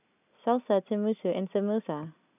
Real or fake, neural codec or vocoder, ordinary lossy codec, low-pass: real; none; none; 3.6 kHz